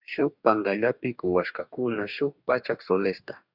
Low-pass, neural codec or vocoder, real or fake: 5.4 kHz; codec, 44.1 kHz, 2.6 kbps, DAC; fake